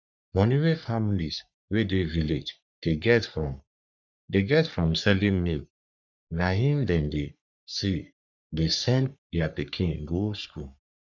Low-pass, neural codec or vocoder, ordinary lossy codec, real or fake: 7.2 kHz; codec, 44.1 kHz, 3.4 kbps, Pupu-Codec; none; fake